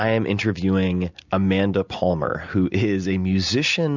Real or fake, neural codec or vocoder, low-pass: real; none; 7.2 kHz